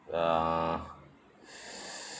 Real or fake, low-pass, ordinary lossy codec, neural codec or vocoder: real; none; none; none